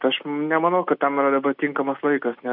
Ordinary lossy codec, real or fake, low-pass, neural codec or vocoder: MP3, 48 kbps; real; 5.4 kHz; none